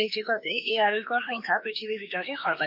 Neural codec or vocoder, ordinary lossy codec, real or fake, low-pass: autoencoder, 48 kHz, 32 numbers a frame, DAC-VAE, trained on Japanese speech; MP3, 48 kbps; fake; 5.4 kHz